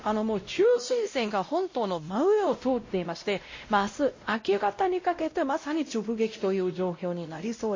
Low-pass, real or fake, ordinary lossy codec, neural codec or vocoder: 7.2 kHz; fake; MP3, 32 kbps; codec, 16 kHz, 0.5 kbps, X-Codec, WavLM features, trained on Multilingual LibriSpeech